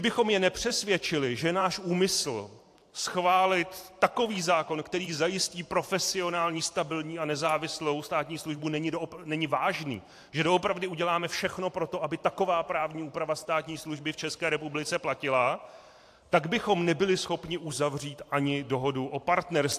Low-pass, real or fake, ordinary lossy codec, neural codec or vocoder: 14.4 kHz; fake; AAC, 64 kbps; vocoder, 44.1 kHz, 128 mel bands every 512 samples, BigVGAN v2